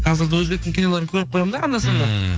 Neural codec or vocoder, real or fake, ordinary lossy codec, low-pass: codec, 16 kHz, 4 kbps, X-Codec, HuBERT features, trained on general audio; fake; none; none